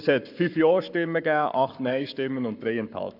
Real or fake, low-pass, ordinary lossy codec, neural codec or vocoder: fake; 5.4 kHz; none; codec, 44.1 kHz, 7.8 kbps, Pupu-Codec